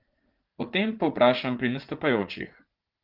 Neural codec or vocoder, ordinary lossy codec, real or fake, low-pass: vocoder, 44.1 kHz, 80 mel bands, Vocos; Opus, 16 kbps; fake; 5.4 kHz